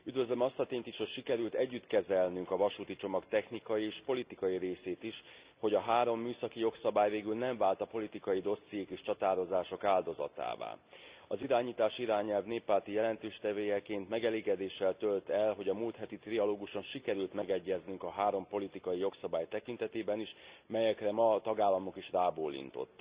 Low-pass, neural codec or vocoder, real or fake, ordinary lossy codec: 3.6 kHz; none; real; Opus, 64 kbps